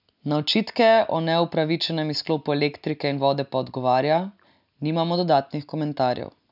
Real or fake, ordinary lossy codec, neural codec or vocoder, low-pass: real; none; none; 5.4 kHz